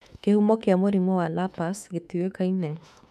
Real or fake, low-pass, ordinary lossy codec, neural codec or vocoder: fake; 14.4 kHz; none; autoencoder, 48 kHz, 32 numbers a frame, DAC-VAE, trained on Japanese speech